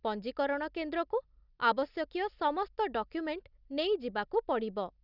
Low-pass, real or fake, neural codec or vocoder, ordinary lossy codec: 5.4 kHz; real; none; none